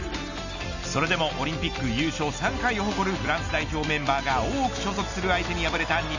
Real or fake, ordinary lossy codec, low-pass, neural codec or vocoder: real; none; 7.2 kHz; none